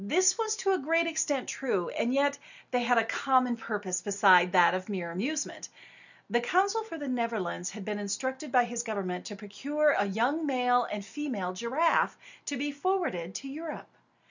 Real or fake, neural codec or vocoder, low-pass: real; none; 7.2 kHz